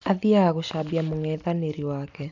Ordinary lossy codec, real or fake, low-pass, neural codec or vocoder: none; real; 7.2 kHz; none